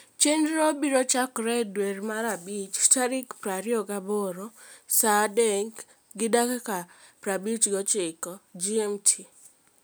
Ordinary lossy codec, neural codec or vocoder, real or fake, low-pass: none; none; real; none